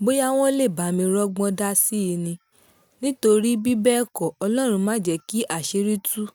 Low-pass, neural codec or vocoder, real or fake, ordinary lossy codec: none; none; real; none